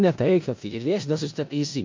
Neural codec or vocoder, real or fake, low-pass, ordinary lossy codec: codec, 16 kHz in and 24 kHz out, 0.4 kbps, LongCat-Audio-Codec, four codebook decoder; fake; 7.2 kHz; MP3, 48 kbps